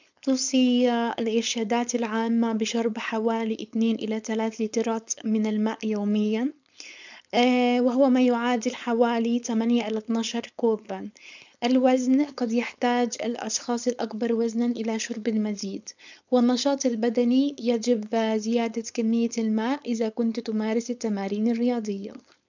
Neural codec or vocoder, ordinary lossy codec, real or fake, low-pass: codec, 16 kHz, 4.8 kbps, FACodec; none; fake; 7.2 kHz